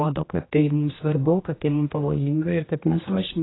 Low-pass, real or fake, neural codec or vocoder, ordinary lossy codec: 7.2 kHz; fake; codec, 16 kHz, 1 kbps, X-Codec, HuBERT features, trained on general audio; AAC, 16 kbps